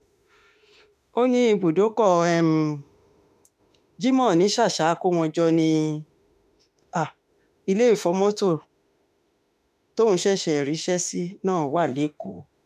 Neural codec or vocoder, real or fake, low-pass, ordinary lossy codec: autoencoder, 48 kHz, 32 numbers a frame, DAC-VAE, trained on Japanese speech; fake; 14.4 kHz; none